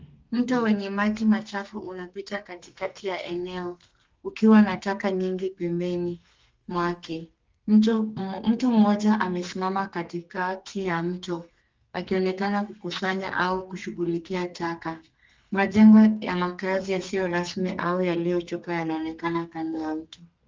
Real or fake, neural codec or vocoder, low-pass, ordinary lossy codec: fake; codec, 32 kHz, 1.9 kbps, SNAC; 7.2 kHz; Opus, 24 kbps